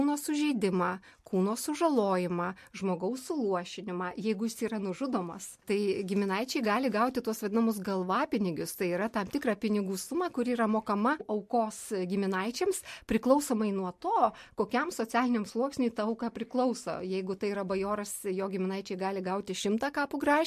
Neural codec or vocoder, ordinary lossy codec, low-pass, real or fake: none; MP3, 64 kbps; 14.4 kHz; real